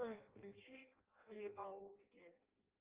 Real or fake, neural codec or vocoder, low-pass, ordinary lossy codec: fake; codec, 16 kHz in and 24 kHz out, 0.6 kbps, FireRedTTS-2 codec; 3.6 kHz; Opus, 24 kbps